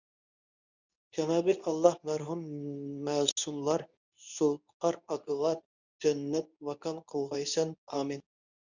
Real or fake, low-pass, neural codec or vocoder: fake; 7.2 kHz; codec, 24 kHz, 0.9 kbps, WavTokenizer, medium speech release version 2